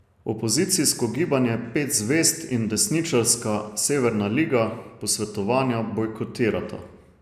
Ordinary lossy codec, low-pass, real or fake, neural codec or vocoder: none; 14.4 kHz; real; none